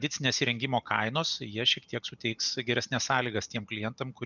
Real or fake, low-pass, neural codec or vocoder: real; 7.2 kHz; none